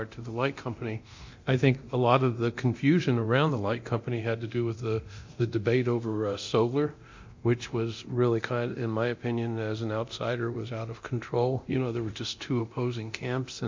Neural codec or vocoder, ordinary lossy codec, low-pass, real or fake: codec, 24 kHz, 0.9 kbps, DualCodec; MP3, 48 kbps; 7.2 kHz; fake